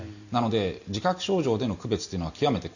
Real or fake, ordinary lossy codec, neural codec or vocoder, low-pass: real; none; none; 7.2 kHz